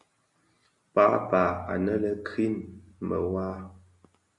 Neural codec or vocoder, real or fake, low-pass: none; real; 10.8 kHz